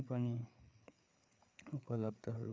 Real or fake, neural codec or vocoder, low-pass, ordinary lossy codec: fake; codec, 16 kHz, 4 kbps, FreqCodec, larger model; none; none